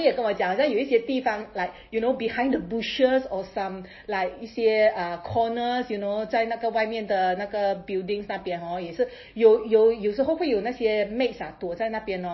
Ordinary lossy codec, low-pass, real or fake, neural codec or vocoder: MP3, 24 kbps; 7.2 kHz; real; none